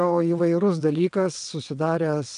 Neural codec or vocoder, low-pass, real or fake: vocoder, 22.05 kHz, 80 mel bands, WaveNeXt; 9.9 kHz; fake